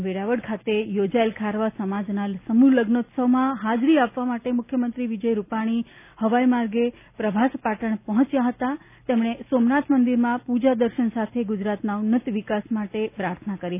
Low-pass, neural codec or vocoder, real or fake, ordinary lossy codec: 3.6 kHz; none; real; none